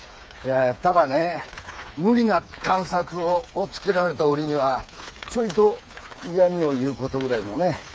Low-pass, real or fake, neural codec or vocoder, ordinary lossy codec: none; fake; codec, 16 kHz, 4 kbps, FreqCodec, smaller model; none